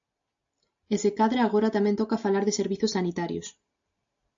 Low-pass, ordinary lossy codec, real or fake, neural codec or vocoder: 7.2 kHz; AAC, 64 kbps; real; none